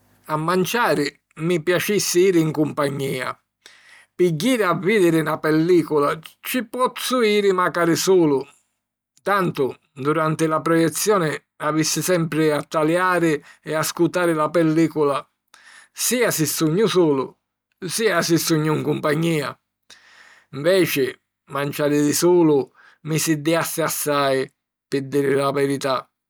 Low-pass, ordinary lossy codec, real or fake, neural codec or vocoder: none; none; real; none